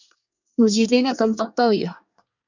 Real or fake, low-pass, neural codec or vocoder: fake; 7.2 kHz; codec, 24 kHz, 1 kbps, SNAC